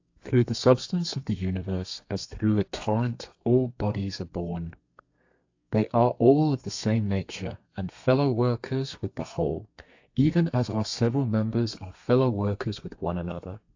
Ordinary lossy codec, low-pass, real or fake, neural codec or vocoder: AAC, 48 kbps; 7.2 kHz; fake; codec, 32 kHz, 1.9 kbps, SNAC